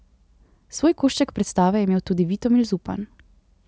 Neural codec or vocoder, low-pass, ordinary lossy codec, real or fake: none; none; none; real